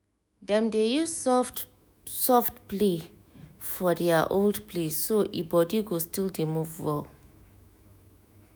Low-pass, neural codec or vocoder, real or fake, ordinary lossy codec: none; autoencoder, 48 kHz, 128 numbers a frame, DAC-VAE, trained on Japanese speech; fake; none